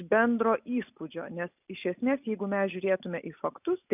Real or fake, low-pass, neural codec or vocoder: real; 3.6 kHz; none